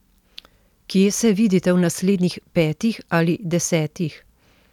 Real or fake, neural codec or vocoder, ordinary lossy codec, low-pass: real; none; none; 19.8 kHz